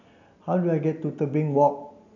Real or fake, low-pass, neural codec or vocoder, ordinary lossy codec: real; 7.2 kHz; none; none